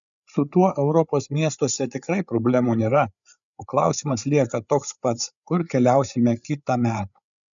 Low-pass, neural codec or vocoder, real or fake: 7.2 kHz; codec, 16 kHz, 8 kbps, FreqCodec, larger model; fake